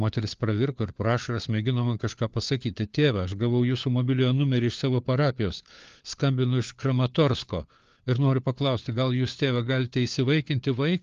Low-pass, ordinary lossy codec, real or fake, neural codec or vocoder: 7.2 kHz; Opus, 32 kbps; fake; codec, 16 kHz, 4 kbps, FunCodec, trained on LibriTTS, 50 frames a second